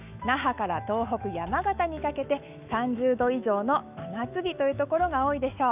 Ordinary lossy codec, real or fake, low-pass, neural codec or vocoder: none; fake; 3.6 kHz; autoencoder, 48 kHz, 128 numbers a frame, DAC-VAE, trained on Japanese speech